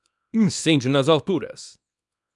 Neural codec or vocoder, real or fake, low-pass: codec, 24 kHz, 0.9 kbps, WavTokenizer, small release; fake; 10.8 kHz